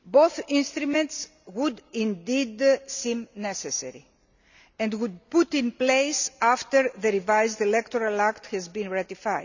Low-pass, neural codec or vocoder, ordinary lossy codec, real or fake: 7.2 kHz; none; none; real